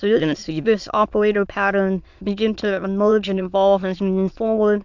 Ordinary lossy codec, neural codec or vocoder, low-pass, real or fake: MP3, 64 kbps; autoencoder, 22.05 kHz, a latent of 192 numbers a frame, VITS, trained on many speakers; 7.2 kHz; fake